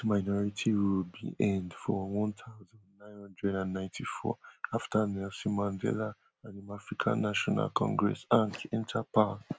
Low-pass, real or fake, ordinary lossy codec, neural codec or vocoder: none; real; none; none